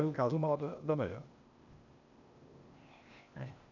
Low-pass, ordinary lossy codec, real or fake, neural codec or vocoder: 7.2 kHz; none; fake; codec, 16 kHz, 0.8 kbps, ZipCodec